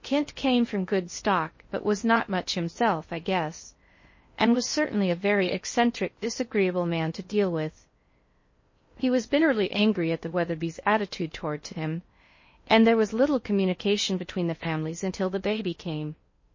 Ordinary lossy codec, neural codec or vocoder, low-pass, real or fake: MP3, 32 kbps; codec, 16 kHz in and 24 kHz out, 0.6 kbps, FocalCodec, streaming, 2048 codes; 7.2 kHz; fake